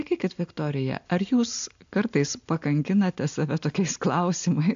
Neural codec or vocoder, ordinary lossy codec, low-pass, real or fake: none; AAC, 64 kbps; 7.2 kHz; real